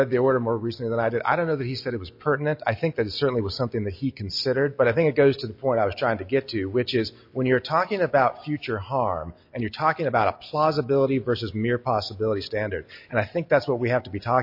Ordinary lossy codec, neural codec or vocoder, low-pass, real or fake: MP3, 32 kbps; none; 5.4 kHz; real